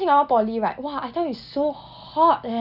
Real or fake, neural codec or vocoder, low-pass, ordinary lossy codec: real; none; 5.4 kHz; none